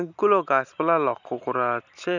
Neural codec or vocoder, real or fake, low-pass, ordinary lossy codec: none; real; 7.2 kHz; none